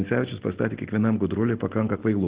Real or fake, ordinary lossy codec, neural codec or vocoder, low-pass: real; Opus, 16 kbps; none; 3.6 kHz